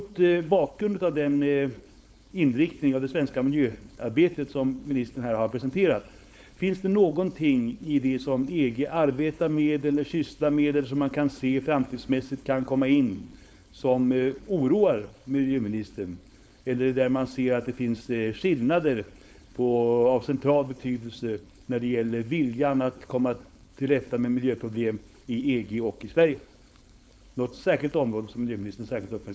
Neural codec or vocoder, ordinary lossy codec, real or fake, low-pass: codec, 16 kHz, 4.8 kbps, FACodec; none; fake; none